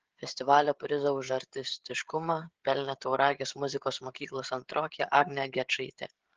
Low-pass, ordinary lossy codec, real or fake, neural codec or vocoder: 7.2 kHz; Opus, 16 kbps; fake; codec, 16 kHz, 16 kbps, FreqCodec, smaller model